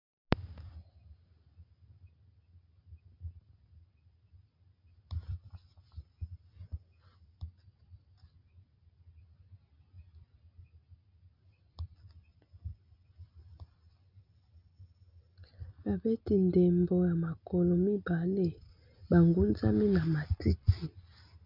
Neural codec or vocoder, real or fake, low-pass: none; real; 5.4 kHz